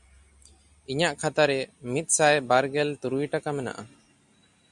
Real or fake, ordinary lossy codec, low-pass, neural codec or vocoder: real; MP3, 96 kbps; 10.8 kHz; none